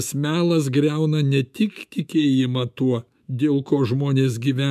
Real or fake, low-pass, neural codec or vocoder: fake; 14.4 kHz; autoencoder, 48 kHz, 128 numbers a frame, DAC-VAE, trained on Japanese speech